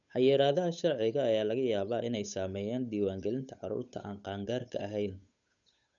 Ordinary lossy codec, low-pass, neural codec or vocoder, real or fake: none; 7.2 kHz; codec, 16 kHz, 8 kbps, FunCodec, trained on Chinese and English, 25 frames a second; fake